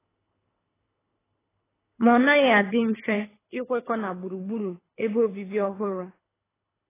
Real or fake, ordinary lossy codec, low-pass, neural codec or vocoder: fake; AAC, 16 kbps; 3.6 kHz; codec, 24 kHz, 3 kbps, HILCodec